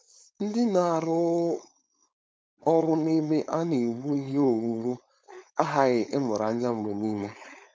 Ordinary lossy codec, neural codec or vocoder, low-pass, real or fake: none; codec, 16 kHz, 4.8 kbps, FACodec; none; fake